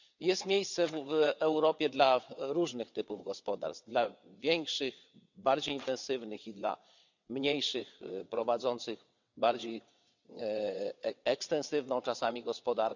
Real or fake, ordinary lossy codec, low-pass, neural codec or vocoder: fake; none; 7.2 kHz; vocoder, 22.05 kHz, 80 mel bands, WaveNeXt